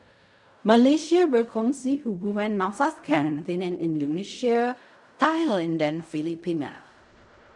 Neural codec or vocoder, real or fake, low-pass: codec, 16 kHz in and 24 kHz out, 0.4 kbps, LongCat-Audio-Codec, fine tuned four codebook decoder; fake; 10.8 kHz